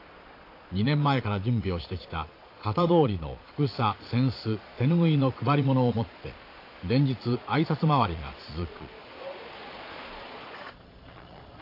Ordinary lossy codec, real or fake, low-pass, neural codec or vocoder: AAC, 32 kbps; fake; 5.4 kHz; vocoder, 22.05 kHz, 80 mel bands, Vocos